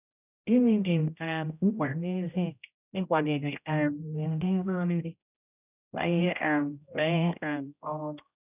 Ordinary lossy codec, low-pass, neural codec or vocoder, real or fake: none; 3.6 kHz; codec, 16 kHz, 0.5 kbps, X-Codec, HuBERT features, trained on general audio; fake